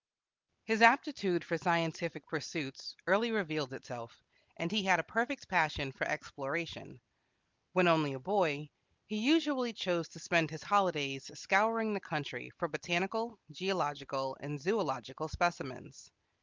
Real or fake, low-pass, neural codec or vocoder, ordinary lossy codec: real; 7.2 kHz; none; Opus, 32 kbps